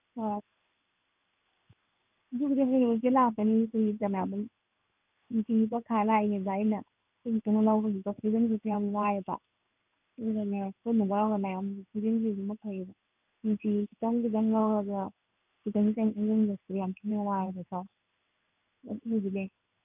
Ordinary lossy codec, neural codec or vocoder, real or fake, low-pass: none; codec, 16 kHz in and 24 kHz out, 1 kbps, XY-Tokenizer; fake; 3.6 kHz